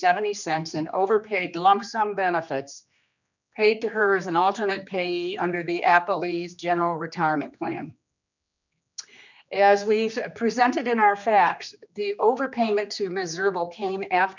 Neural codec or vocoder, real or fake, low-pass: codec, 16 kHz, 2 kbps, X-Codec, HuBERT features, trained on general audio; fake; 7.2 kHz